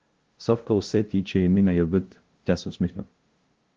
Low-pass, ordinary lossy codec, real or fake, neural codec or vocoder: 7.2 kHz; Opus, 16 kbps; fake; codec, 16 kHz, 0.5 kbps, FunCodec, trained on LibriTTS, 25 frames a second